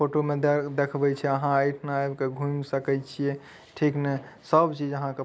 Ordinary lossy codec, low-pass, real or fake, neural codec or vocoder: none; none; real; none